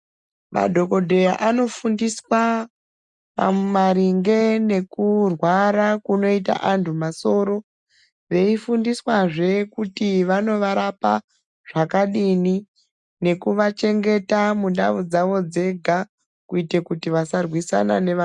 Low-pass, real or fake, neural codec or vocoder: 10.8 kHz; real; none